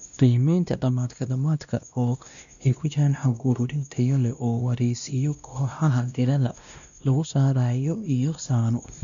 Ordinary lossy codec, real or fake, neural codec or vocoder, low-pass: none; fake; codec, 16 kHz, 1 kbps, X-Codec, WavLM features, trained on Multilingual LibriSpeech; 7.2 kHz